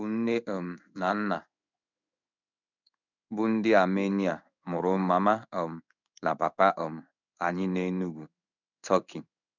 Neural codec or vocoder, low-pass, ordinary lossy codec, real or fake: codec, 16 kHz in and 24 kHz out, 1 kbps, XY-Tokenizer; 7.2 kHz; none; fake